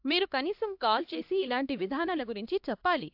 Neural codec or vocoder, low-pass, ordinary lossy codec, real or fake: codec, 16 kHz, 1 kbps, X-Codec, HuBERT features, trained on LibriSpeech; 5.4 kHz; AAC, 48 kbps; fake